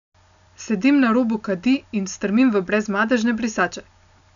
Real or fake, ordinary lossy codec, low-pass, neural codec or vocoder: real; none; 7.2 kHz; none